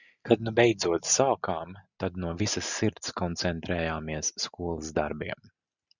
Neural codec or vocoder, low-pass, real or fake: none; 7.2 kHz; real